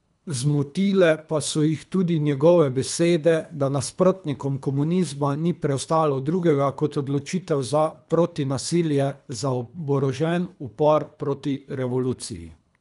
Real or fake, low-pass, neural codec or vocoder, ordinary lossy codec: fake; 10.8 kHz; codec, 24 kHz, 3 kbps, HILCodec; none